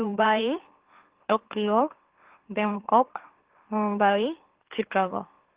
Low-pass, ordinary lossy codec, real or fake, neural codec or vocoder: 3.6 kHz; Opus, 16 kbps; fake; autoencoder, 44.1 kHz, a latent of 192 numbers a frame, MeloTTS